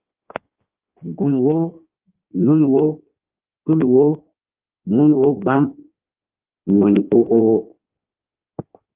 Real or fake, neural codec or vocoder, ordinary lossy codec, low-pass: fake; codec, 16 kHz in and 24 kHz out, 1.1 kbps, FireRedTTS-2 codec; Opus, 32 kbps; 3.6 kHz